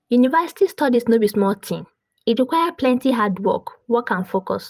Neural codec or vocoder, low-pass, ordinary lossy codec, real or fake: vocoder, 44.1 kHz, 128 mel bands every 256 samples, BigVGAN v2; 14.4 kHz; Opus, 32 kbps; fake